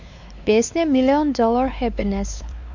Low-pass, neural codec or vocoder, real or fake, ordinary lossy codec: 7.2 kHz; codec, 16 kHz, 4 kbps, X-Codec, WavLM features, trained on Multilingual LibriSpeech; fake; none